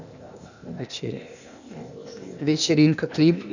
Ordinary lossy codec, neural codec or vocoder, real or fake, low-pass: none; codec, 16 kHz, 0.8 kbps, ZipCodec; fake; 7.2 kHz